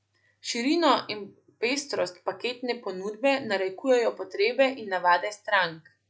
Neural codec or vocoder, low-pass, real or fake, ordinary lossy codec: none; none; real; none